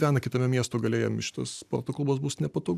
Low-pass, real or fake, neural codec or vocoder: 14.4 kHz; real; none